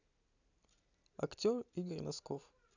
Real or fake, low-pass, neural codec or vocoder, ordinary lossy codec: real; 7.2 kHz; none; none